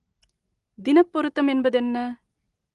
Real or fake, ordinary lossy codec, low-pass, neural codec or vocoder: real; Opus, 24 kbps; 10.8 kHz; none